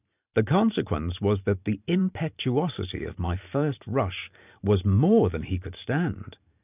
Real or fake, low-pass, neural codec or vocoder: fake; 3.6 kHz; vocoder, 44.1 kHz, 128 mel bands every 512 samples, BigVGAN v2